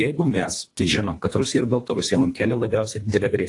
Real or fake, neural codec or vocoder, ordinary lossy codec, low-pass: fake; codec, 24 kHz, 1.5 kbps, HILCodec; AAC, 48 kbps; 10.8 kHz